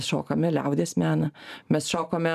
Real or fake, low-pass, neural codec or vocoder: fake; 14.4 kHz; vocoder, 44.1 kHz, 128 mel bands every 512 samples, BigVGAN v2